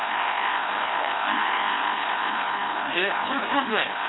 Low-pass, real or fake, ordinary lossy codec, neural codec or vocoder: 7.2 kHz; fake; AAC, 16 kbps; codec, 16 kHz, 1 kbps, FreqCodec, larger model